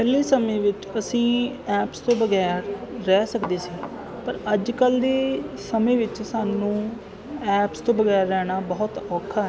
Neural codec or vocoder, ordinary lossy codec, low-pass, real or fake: none; none; none; real